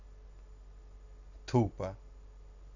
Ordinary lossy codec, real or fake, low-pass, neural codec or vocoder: none; real; 7.2 kHz; none